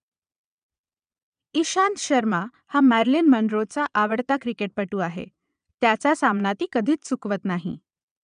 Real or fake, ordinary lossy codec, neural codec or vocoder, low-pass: fake; none; vocoder, 22.05 kHz, 80 mel bands, Vocos; 9.9 kHz